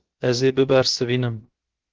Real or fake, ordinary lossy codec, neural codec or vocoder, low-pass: fake; Opus, 16 kbps; codec, 16 kHz, about 1 kbps, DyCAST, with the encoder's durations; 7.2 kHz